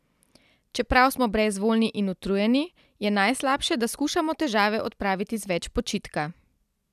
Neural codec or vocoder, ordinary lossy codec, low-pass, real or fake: vocoder, 44.1 kHz, 128 mel bands every 256 samples, BigVGAN v2; none; 14.4 kHz; fake